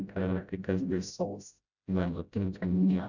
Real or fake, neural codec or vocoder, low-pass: fake; codec, 16 kHz, 0.5 kbps, FreqCodec, smaller model; 7.2 kHz